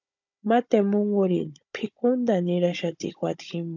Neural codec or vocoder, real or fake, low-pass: codec, 16 kHz, 16 kbps, FunCodec, trained on Chinese and English, 50 frames a second; fake; 7.2 kHz